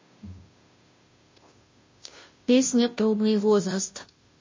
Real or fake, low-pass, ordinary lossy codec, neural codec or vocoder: fake; 7.2 kHz; MP3, 32 kbps; codec, 16 kHz, 0.5 kbps, FunCodec, trained on Chinese and English, 25 frames a second